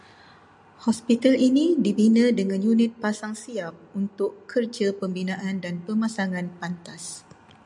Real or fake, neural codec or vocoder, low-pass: real; none; 10.8 kHz